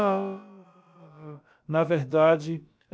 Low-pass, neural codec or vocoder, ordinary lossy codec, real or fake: none; codec, 16 kHz, about 1 kbps, DyCAST, with the encoder's durations; none; fake